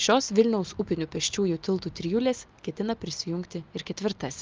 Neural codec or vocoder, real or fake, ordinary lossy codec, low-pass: none; real; Opus, 24 kbps; 7.2 kHz